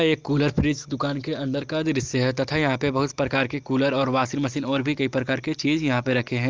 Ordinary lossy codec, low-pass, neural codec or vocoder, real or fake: Opus, 32 kbps; 7.2 kHz; none; real